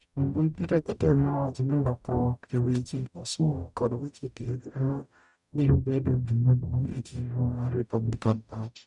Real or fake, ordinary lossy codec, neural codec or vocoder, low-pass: fake; none; codec, 44.1 kHz, 0.9 kbps, DAC; 10.8 kHz